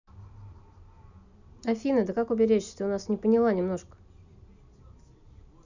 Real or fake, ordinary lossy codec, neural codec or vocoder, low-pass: real; none; none; 7.2 kHz